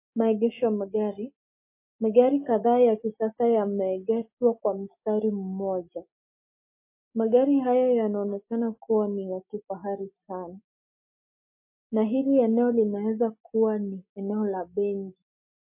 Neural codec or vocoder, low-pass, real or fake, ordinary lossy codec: none; 3.6 kHz; real; MP3, 24 kbps